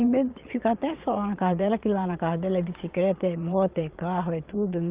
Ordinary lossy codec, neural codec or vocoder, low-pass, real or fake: Opus, 32 kbps; vocoder, 22.05 kHz, 80 mel bands, Vocos; 3.6 kHz; fake